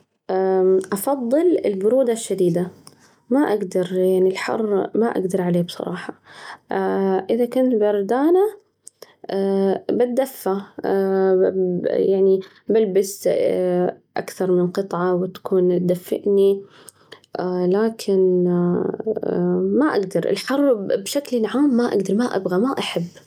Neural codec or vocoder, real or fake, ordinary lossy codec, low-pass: none; real; none; 19.8 kHz